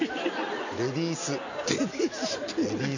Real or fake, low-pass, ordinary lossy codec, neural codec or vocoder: real; 7.2 kHz; none; none